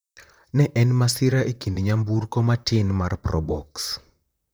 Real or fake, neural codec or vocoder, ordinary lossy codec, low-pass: fake; vocoder, 44.1 kHz, 128 mel bands, Pupu-Vocoder; none; none